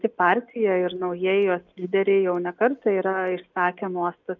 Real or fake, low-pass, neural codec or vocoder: real; 7.2 kHz; none